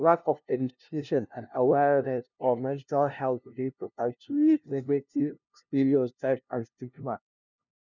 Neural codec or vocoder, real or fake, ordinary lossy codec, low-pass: codec, 16 kHz, 0.5 kbps, FunCodec, trained on LibriTTS, 25 frames a second; fake; none; 7.2 kHz